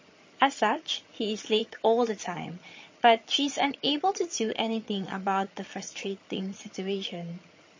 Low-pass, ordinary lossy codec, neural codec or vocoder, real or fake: 7.2 kHz; MP3, 32 kbps; vocoder, 22.05 kHz, 80 mel bands, HiFi-GAN; fake